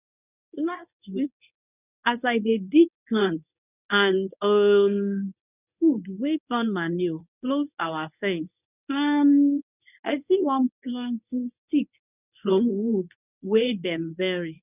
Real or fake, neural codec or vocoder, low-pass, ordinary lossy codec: fake; codec, 24 kHz, 0.9 kbps, WavTokenizer, medium speech release version 1; 3.6 kHz; none